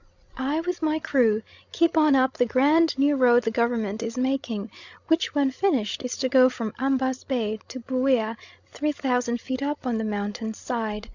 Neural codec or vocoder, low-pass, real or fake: codec, 16 kHz, 16 kbps, FreqCodec, larger model; 7.2 kHz; fake